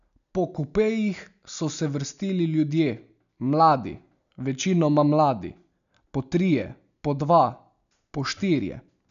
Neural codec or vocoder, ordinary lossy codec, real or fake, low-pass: none; none; real; 7.2 kHz